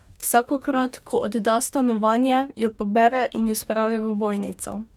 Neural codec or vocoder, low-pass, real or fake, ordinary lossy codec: codec, 44.1 kHz, 2.6 kbps, DAC; 19.8 kHz; fake; none